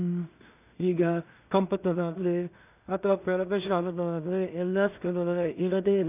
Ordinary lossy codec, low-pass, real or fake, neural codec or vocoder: none; 3.6 kHz; fake; codec, 16 kHz in and 24 kHz out, 0.4 kbps, LongCat-Audio-Codec, two codebook decoder